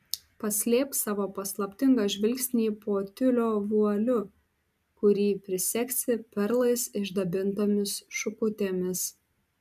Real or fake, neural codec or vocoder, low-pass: real; none; 14.4 kHz